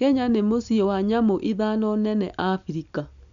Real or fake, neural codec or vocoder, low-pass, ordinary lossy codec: real; none; 7.2 kHz; none